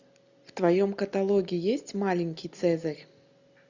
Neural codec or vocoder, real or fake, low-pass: none; real; 7.2 kHz